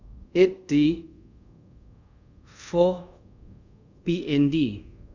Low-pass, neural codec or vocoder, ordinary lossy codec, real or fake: 7.2 kHz; codec, 24 kHz, 0.5 kbps, DualCodec; none; fake